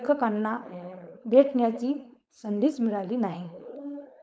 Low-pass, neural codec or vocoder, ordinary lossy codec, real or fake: none; codec, 16 kHz, 4.8 kbps, FACodec; none; fake